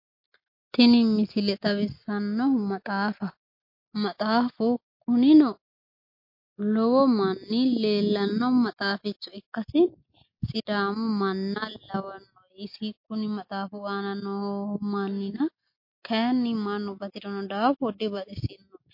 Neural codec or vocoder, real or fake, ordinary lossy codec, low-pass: none; real; MP3, 32 kbps; 5.4 kHz